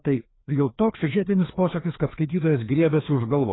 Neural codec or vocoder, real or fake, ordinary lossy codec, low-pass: codec, 16 kHz, 2 kbps, FreqCodec, larger model; fake; AAC, 16 kbps; 7.2 kHz